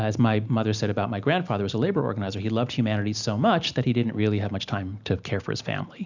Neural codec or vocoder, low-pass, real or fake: none; 7.2 kHz; real